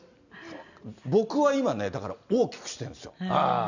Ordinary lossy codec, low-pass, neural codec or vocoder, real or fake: none; 7.2 kHz; none; real